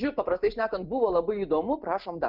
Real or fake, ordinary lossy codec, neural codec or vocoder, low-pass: real; Opus, 24 kbps; none; 5.4 kHz